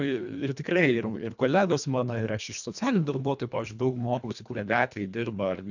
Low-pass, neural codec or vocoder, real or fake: 7.2 kHz; codec, 24 kHz, 1.5 kbps, HILCodec; fake